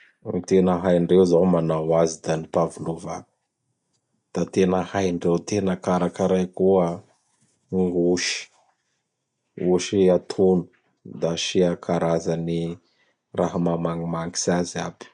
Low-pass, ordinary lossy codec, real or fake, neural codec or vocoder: 10.8 kHz; MP3, 96 kbps; real; none